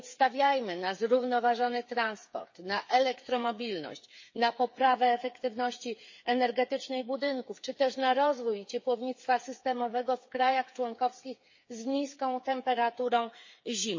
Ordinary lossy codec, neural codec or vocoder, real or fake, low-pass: MP3, 32 kbps; codec, 16 kHz, 8 kbps, FreqCodec, smaller model; fake; 7.2 kHz